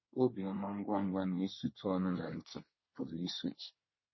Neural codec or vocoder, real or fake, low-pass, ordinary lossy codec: codec, 24 kHz, 1 kbps, SNAC; fake; 7.2 kHz; MP3, 24 kbps